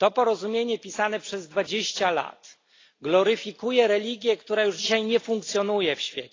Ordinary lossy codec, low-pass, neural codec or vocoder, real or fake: AAC, 32 kbps; 7.2 kHz; none; real